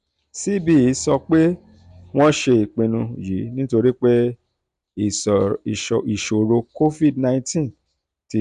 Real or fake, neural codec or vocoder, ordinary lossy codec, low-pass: real; none; none; 10.8 kHz